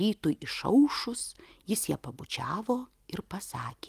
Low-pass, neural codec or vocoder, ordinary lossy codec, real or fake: 14.4 kHz; none; Opus, 32 kbps; real